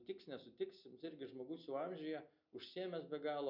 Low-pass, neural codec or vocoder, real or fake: 5.4 kHz; none; real